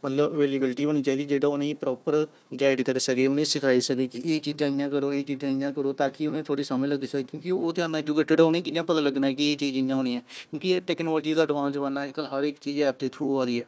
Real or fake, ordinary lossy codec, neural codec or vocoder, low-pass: fake; none; codec, 16 kHz, 1 kbps, FunCodec, trained on Chinese and English, 50 frames a second; none